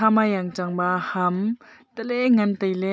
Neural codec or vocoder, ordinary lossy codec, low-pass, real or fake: none; none; none; real